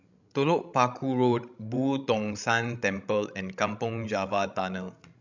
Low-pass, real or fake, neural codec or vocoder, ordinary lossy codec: 7.2 kHz; fake; codec, 16 kHz, 16 kbps, FreqCodec, larger model; none